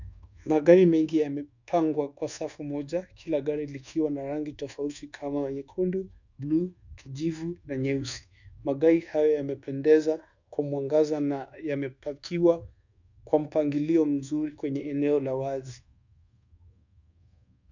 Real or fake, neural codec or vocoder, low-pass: fake; codec, 24 kHz, 1.2 kbps, DualCodec; 7.2 kHz